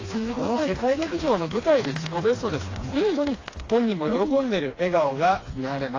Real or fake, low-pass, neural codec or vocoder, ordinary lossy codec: fake; 7.2 kHz; codec, 16 kHz, 2 kbps, FreqCodec, smaller model; AAC, 32 kbps